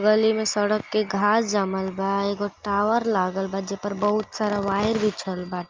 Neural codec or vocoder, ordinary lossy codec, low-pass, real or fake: none; Opus, 24 kbps; 7.2 kHz; real